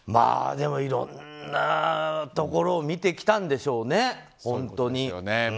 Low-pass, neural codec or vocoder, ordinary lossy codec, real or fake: none; none; none; real